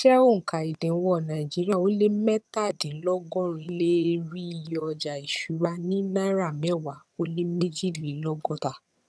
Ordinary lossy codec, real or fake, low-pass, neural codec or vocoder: none; fake; none; vocoder, 22.05 kHz, 80 mel bands, HiFi-GAN